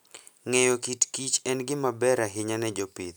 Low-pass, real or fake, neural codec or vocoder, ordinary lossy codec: none; real; none; none